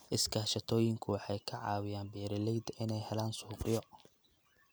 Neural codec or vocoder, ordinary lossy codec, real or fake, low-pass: none; none; real; none